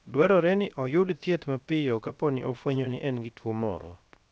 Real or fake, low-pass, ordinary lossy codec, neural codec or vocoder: fake; none; none; codec, 16 kHz, 0.7 kbps, FocalCodec